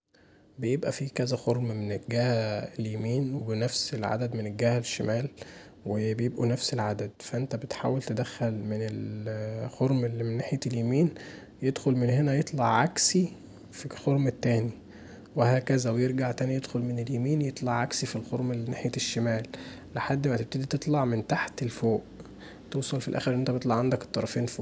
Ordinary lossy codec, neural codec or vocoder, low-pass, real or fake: none; none; none; real